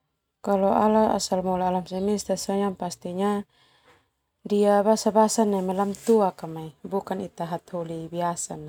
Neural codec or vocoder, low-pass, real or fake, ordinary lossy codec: none; 19.8 kHz; real; none